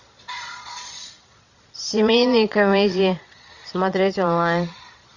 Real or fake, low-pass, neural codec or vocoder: fake; 7.2 kHz; vocoder, 22.05 kHz, 80 mel bands, Vocos